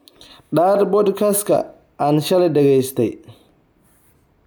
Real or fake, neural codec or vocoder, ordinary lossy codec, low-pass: real; none; none; none